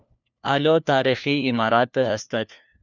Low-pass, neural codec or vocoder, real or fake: 7.2 kHz; codec, 16 kHz, 1 kbps, FunCodec, trained on LibriTTS, 50 frames a second; fake